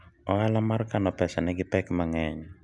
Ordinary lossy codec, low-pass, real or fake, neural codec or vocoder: none; 10.8 kHz; real; none